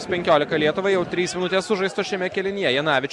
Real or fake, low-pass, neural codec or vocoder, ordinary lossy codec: real; 10.8 kHz; none; Opus, 64 kbps